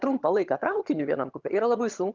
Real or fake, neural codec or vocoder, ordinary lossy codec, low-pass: fake; vocoder, 22.05 kHz, 80 mel bands, HiFi-GAN; Opus, 24 kbps; 7.2 kHz